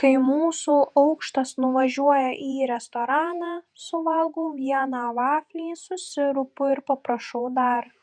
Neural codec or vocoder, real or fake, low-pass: vocoder, 48 kHz, 128 mel bands, Vocos; fake; 9.9 kHz